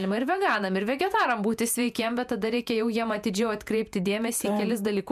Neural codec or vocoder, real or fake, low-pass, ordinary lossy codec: vocoder, 48 kHz, 128 mel bands, Vocos; fake; 14.4 kHz; MP3, 96 kbps